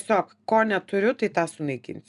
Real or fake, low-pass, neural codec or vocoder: real; 10.8 kHz; none